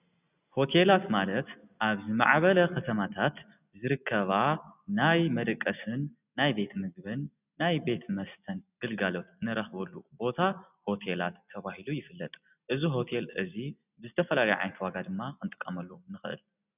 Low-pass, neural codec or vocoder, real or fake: 3.6 kHz; none; real